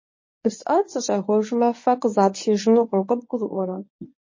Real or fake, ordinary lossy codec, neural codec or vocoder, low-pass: fake; MP3, 32 kbps; codec, 24 kHz, 0.9 kbps, WavTokenizer, medium speech release version 1; 7.2 kHz